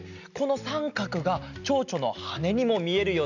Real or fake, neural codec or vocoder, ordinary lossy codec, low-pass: real; none; none; 7.2 kHz